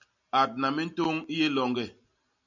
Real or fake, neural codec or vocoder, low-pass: real; none; 7.2 kHz